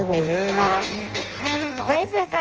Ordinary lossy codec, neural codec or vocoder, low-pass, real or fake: Opus, 24 kbps; codec, 16 kHz in and 24 kHz out, 0.6 kbps, FireRedTTS-2 codec; 7.2 kHz; fake